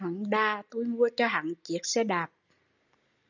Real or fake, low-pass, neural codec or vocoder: real; 7.2 kHz; none